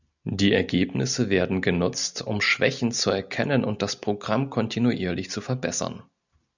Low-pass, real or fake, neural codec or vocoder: 7.2 kHz; real; none